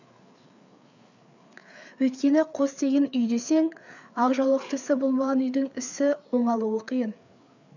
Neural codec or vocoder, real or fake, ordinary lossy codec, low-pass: codec, 16 kHz, 4 kbps, FreqCodec, larger model; fake; none; 7.2 kHz